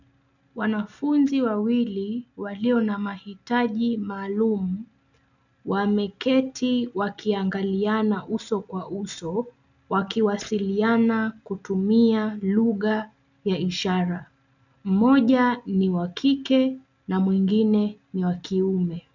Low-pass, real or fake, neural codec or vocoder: 7.2 kHz; real; none